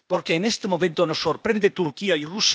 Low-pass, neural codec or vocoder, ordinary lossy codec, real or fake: none; codec, 16 kHz, 0.8 kbps, ZipCodec; none; fake